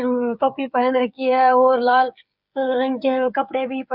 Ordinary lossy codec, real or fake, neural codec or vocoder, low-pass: Opus, 64 kbps; fake; codec, 16 kHz, 16 kbps, FreqCodec, smaller model; 5.4 kHz